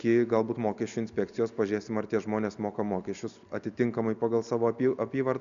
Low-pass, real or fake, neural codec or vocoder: 7.2 kHz; real; none